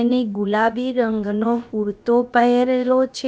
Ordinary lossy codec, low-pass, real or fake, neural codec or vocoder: none; none; fake; codec, 16 kHz, about 1 kbps, DyCAST, with the encoder's durations